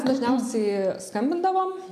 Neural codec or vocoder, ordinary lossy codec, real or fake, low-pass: none; MP3, 96 kbps; real; 14.4 kHz